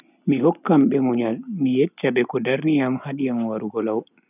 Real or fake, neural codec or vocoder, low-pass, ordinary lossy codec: real; none; 3.6 kHz; none